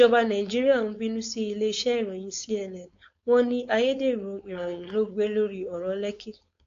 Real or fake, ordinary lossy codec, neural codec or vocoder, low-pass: fake; AAC, 48 kbps; codec, 16 kHz, 4.8 kbps, FACodec; 7.2 kHz